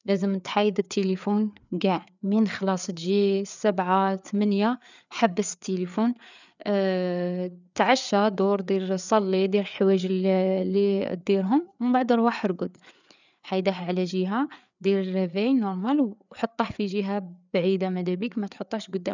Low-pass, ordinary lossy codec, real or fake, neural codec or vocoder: 7.2 kHz; none; fake; codec, 16 kHz, 4 kbps, FreqCodec, larger model